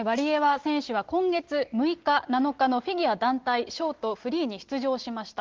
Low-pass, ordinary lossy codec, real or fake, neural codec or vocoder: 7.2 kHz; Opus, 16 kbps; real; none